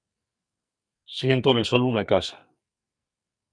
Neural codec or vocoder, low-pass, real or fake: codec, 44.1 kHz, 2.6 kbps, SNAC; 9.9 kHz; fake